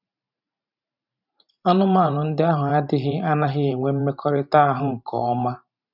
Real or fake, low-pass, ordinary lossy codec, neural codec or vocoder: fake; 5.4 kHz; none; vocoder, 44.1 kHz, 128 mel bands every 512 samples, BigVGAN v2